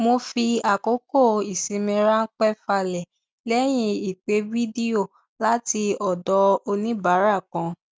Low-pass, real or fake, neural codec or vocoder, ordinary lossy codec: none; real; none; none